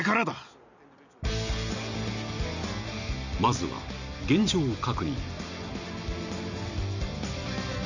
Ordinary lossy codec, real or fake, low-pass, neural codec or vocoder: none; real; 7.2 kHz; none